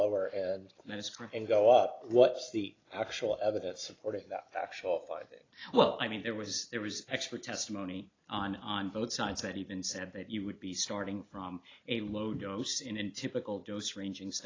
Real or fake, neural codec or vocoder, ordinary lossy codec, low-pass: real; none; AAC, 32 kbps; 7.2 kHz